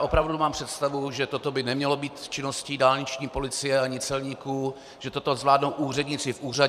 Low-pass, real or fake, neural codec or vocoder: 14.4 kHz; fake; vocoder, 44.1 kHz, 128 mel bands every 512 samples, BigVGAN v2